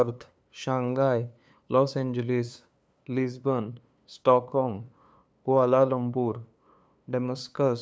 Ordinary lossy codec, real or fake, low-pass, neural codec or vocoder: none; fake; none; codec, 16 kHz, 2 kbps, FunCodec, trained on LibriTTS, 25 frames a second